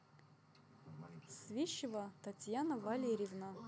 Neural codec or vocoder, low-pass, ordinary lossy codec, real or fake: none; none; none; real